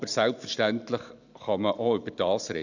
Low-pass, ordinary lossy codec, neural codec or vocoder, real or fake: 7.2 kHz; none; none; real